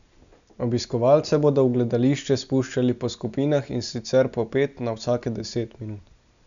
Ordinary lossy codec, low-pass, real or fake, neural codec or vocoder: none; 7.2 kHz; real; none